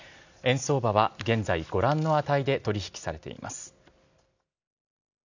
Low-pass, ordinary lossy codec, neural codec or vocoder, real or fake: 7.2 kHz; none; none; real